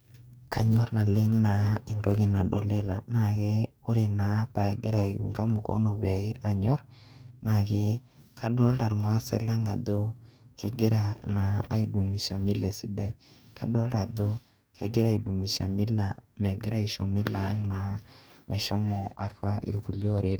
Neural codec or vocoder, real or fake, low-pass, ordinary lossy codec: codec, 44.1 kHz, 2.6 kbps, DAC; fake; none; none